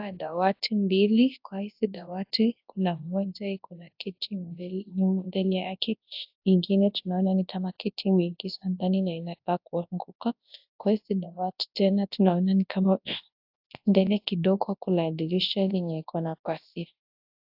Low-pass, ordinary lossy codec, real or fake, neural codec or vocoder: 5.4 kHz; AAC, 48 kbps; fake; codec, 24 kHz, 0.9 kbps, WavTokenizer, large speech release